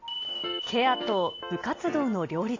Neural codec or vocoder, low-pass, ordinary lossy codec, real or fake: none; 7.2 kHz; AAC, 32 kbps; real